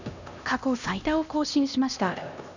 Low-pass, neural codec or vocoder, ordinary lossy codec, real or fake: 7.2 kHz; codec, 16 kHz, 1 kbps, X-Codec, HuBERT features, trained on LibriSpeech; none; fake